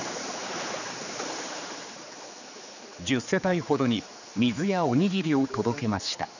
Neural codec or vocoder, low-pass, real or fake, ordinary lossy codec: codec, 16 kHz, 4 kbps, X-Codec, HuBERT features, trained on general audio; 7.2 kHz; fake; none